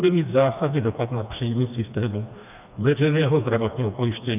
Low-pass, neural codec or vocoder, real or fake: 3.6 kHz; codec, 16 kHz, 2 kbps, FreqCodec, smaller model; fake